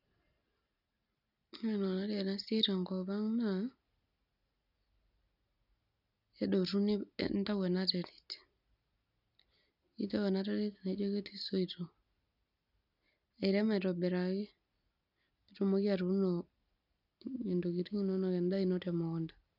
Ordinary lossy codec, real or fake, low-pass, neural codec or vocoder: none; real; 5.4 kHz; none